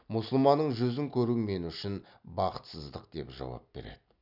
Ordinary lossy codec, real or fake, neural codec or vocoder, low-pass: none; real; none; 5.4 kHz